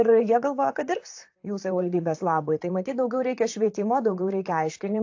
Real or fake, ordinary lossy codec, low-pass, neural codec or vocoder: fake; AAC, 48 kbps; 7.2 kHz; vocoder, 44.1 kHz, 128 mel bands, Pupu-Vocoder